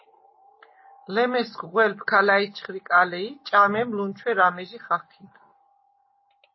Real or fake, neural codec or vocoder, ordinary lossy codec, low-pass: real; none; MP3, 24 kbps; 7.2 kHz